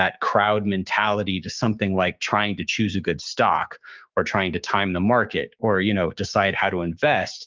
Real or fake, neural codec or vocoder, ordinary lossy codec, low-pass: fake; codec, 16 kHz, 6 kbps, DAC; Opus, 32 kbps; 7.2 kHz